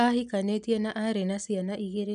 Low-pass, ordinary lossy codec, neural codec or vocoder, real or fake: 10.8 kHz; none; none; real